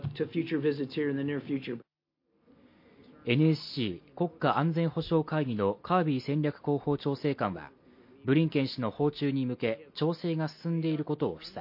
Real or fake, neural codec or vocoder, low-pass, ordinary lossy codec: real; none; 5.4 kHz; MP3, 32 kbps